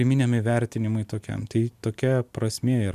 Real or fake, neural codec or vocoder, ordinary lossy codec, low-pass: real; none; MP3, 96 kbps; 14.4 kHz